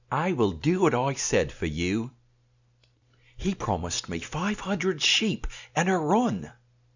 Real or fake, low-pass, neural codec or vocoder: real; 7.2 kHz; none